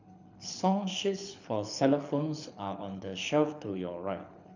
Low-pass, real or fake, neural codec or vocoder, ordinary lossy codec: 7.2 kHz; fake; codec, 24 kHz, 6 kbps, HILCodec; none